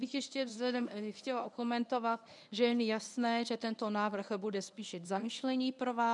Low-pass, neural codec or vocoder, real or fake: 10.8 kHz; codec, 24 kHz, 0.9 kbps, WavTokenizer, medium speech release version 1; fake